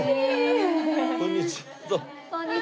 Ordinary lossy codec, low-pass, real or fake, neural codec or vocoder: none; none; real; none